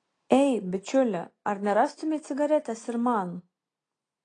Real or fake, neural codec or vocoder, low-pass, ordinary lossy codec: real; none; 9.9 kHz; AAC, 32 kbps